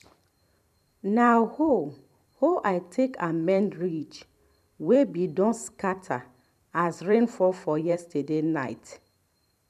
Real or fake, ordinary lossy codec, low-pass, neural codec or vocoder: fake; none; 14.4 kHz; vocoder, 44.1 kHz, 128 mel bands every 512 samples, BigVGAN v2